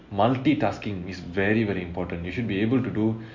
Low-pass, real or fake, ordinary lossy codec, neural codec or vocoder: 7.2 kHz; real; MP3, 48 kbps; none